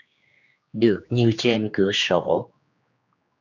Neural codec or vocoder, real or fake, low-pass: codec, 16 kHz, 2 kbps, X-Codec, HuBERT features, trained on general audio; fake; 7.2 kHz